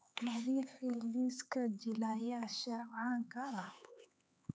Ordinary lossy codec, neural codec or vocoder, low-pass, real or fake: none; codec, 16 kHz, 4 kbps, X-Codec, HuBERT features, trained on LibriSpeech; none; fake